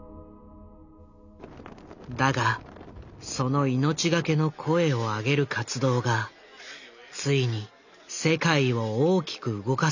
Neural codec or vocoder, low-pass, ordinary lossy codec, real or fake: none; 7.2 kHz; none; real